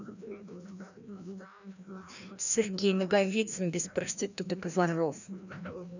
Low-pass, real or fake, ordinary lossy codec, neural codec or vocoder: 7.2 kHz; fake; none; codec, 16 kHz, 1 kbps, FreqCodec, larger model